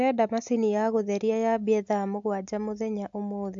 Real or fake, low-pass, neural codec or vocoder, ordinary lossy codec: real; 7.2 kHz; none; AAC, 64 kbps